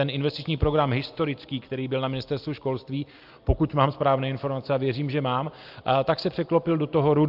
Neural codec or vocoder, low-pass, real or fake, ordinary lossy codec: none; 5.4 kHz; real; Opus, 24 kbps